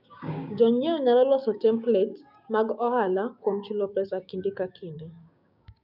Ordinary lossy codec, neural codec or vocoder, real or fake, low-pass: none; codec, 16 kHz, 6 kbps, DAC; fake; 5.4 kHz